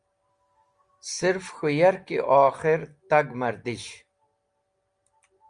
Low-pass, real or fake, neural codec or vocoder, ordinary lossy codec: 9.9 kHz; real; none; Opus, 32 kbps